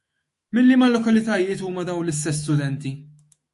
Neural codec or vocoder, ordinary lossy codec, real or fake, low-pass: autoencoder, 48 kHz, 128 numbers a frame, DAC-VAE, trained on Japanese speech; MP3, 48 kbps; fake; 14.4 kHz